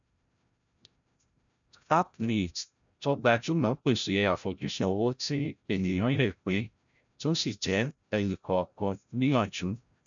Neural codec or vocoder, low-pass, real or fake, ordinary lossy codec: codec, 16 kHz, 0.5 kbps, FreqCodec, larger model; 7.2 kHz; fake; none